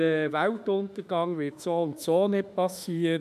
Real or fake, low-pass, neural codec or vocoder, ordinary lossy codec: fake; 14.4 kHz; autoencoder, 48 kHz, 32 numbers a frame, DAC-VAE, trained on Japanese speech; none